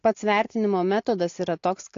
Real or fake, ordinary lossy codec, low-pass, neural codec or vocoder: real; AAC, 48 kbps; 7.2 kHz; none